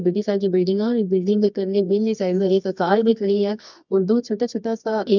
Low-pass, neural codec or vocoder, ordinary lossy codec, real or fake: 7.2 kHz; codec, 24 kHz, 0.9 kbps, WavTokenizer, medium music audio release; none; fake